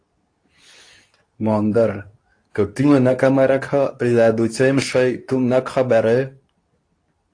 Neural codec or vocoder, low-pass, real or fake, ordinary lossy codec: codec, 24 kHz, 0.9 kbps, WavTokenizer, medium speech release version 2; 9.9 kHz; fake; AAC, 48 kbps